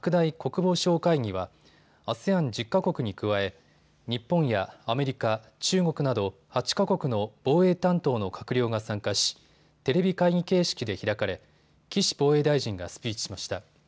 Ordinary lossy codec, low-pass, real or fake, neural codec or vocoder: none; none; real; none